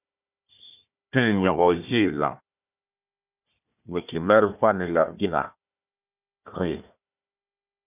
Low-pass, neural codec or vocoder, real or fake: 3.6 kHz; codec, 16 kHz, 1 kbps, FunCodec, trained on Chinese and English, 50 frames a second; fake